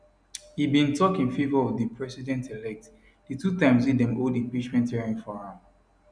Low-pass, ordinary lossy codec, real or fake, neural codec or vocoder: 9.9 kHz; none; real; none